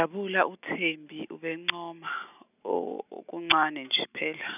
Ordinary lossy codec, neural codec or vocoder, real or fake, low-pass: none; none; real; 3.6 kHz